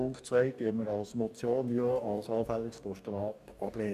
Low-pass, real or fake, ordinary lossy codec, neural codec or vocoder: 14.4 kHz; fake; none; codec, 44.1 kHz, 2.6 kbps, DAC